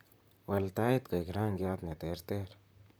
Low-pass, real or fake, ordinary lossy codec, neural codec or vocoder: none; fake; none; vocoder, 44.1 kHz, 128 mel bands, Pupu-Vocoder